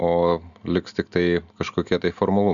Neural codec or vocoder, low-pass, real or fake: none; 7.2 kHz; real